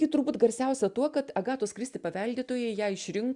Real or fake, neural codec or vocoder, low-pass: real; none; 10.8 kHz